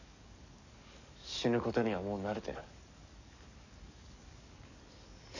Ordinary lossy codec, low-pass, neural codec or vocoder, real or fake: none; 7.2 kHz; codec, 44.1 kHz, 7.8 kbps, DAC; fake